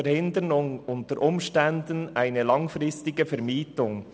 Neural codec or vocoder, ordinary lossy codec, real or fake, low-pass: none; none; real; none